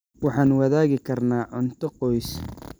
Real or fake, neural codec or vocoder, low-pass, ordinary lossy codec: real; none; none; none